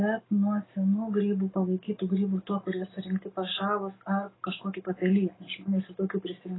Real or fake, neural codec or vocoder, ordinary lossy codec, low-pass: real; none; AAC, 16 kbps; 7.2 kHz